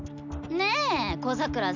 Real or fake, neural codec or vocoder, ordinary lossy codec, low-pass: real; none; none; 7.2 kHz